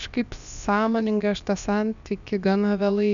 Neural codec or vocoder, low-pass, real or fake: codec, 16 kHz, about 1 kbps, DyCAST, with the encoder's durations; 7.2 kHz; fake